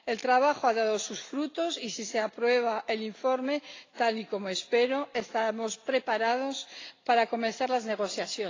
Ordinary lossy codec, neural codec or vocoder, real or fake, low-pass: AAC, 32 kbps; none; real; 7.2 kHz